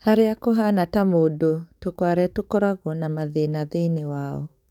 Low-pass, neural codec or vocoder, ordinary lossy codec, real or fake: 19.8 kHz; codec, 44.1 kHz, 7.8 kbps, DAC; none; fake